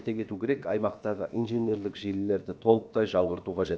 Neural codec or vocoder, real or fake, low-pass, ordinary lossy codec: codec, 16 kHz, about 1 kbps, DyCAST, with the encoder's durations; fake; none; none